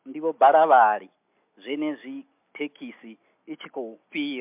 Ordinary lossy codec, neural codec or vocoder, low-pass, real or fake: MP3, 32 kbps; none; 3.6 kHz; real